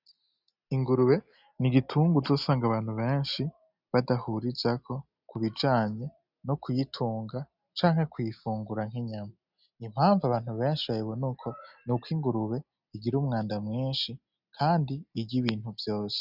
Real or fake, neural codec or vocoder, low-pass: real; none; 5.4 kHz